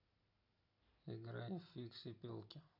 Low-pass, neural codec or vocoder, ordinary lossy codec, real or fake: 5.4 kHz; none; none; real